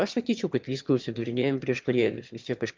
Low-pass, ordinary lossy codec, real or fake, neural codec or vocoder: 7.2 kHz; Opus, 32 kbps; fake; autoencoder, 22.05 kHz, a latent of 192 numbers a frame, VITS, trained on one speaker